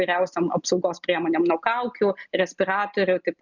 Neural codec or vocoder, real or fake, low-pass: none; real; 7.2 kHz